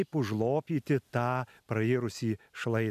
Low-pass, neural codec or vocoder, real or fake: 14.4 kHz; none; real